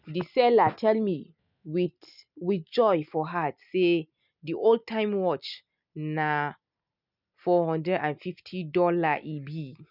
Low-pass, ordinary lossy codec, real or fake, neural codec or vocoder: 5.4 kHz; none; real; none